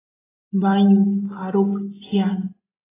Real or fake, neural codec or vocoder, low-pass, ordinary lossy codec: real; none; 3.6 kHz; AAC, 16 kbps